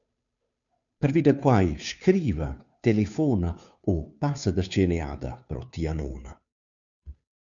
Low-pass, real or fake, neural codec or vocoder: 7.2 kHz; fake; codec, 16 kHz, 2 kbps, FunCodec, trained on Chinese and English, 25 frames a second